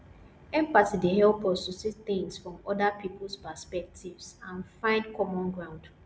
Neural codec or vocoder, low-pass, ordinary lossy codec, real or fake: none; none; none; real